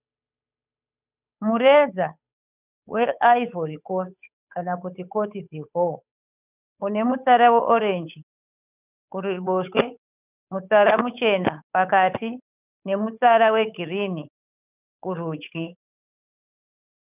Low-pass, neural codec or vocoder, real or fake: 3.6 kHz; codec, 16 kHz, 8 kbps, FunCodec, trained on Chinese and English, 25 frames a second; fake